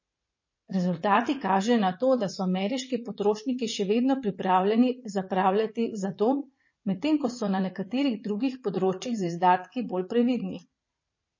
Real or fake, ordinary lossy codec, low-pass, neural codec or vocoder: fake; MP3, 32 kbps; 7.2 kHz; vocoder, 44.1 kHz, 80 mel bands, Vocos